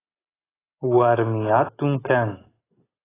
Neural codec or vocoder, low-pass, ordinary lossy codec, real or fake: none; 3.6 kHz; AAC, 16 kbps; real